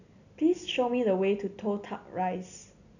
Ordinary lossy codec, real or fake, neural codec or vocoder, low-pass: none; real; none; 7.2 kHz